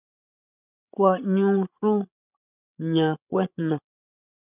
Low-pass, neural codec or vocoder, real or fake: 3.6 kHz; codec, 16 kHz, 16 kbps, FreqCodec, larger model; fake